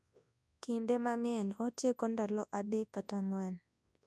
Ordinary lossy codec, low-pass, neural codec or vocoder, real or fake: none; none; codec, 24 kHz, 0.9 kbps, WavTokenizer, large speech release; fake